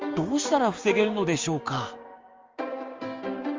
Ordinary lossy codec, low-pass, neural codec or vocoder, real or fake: Opus, 32 kbps; 7.2 kHz; codec, 16 kHz, 6 kbps, DAC; fake